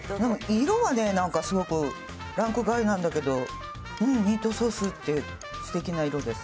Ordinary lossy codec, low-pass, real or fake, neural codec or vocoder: none; none; real; none